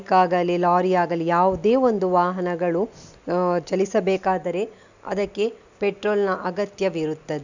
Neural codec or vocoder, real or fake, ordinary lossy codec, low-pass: none; real; none; 7.2 kHz